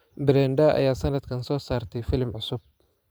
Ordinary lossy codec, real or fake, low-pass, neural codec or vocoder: none; fake; none; vocoder, 44.1 kHz, 128 mel bands every 512 samples, BigVGAN v2